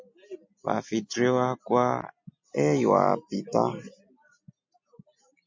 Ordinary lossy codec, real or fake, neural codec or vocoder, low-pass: MP3, 48 kbps; real; none; 7.2 kHz